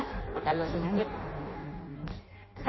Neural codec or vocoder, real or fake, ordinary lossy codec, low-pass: codec, 16 kHz in and 24 kHz out, 0.6 kbps, FireRedTTS-2 codec; fake; MP3, 24 kbps; 7.2 kHz